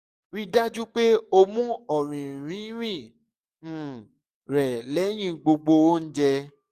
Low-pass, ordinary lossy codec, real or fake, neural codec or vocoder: 14.4 kHz; Opus, 64 kbps; fake; codec, 44.1 kHz, 7.8 kbps, DAC